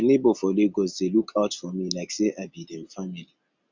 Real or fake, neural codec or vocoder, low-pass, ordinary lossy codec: real; none; 7.2 kHz; Opus, 64 kbps